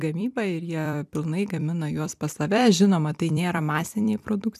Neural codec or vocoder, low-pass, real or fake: vocoder, 44.1 kHz, 128 mel bands every 256 samples, BigVGAN v2; 14.4 kHz; fake